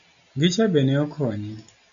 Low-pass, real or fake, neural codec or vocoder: 7.2 kHz; real; none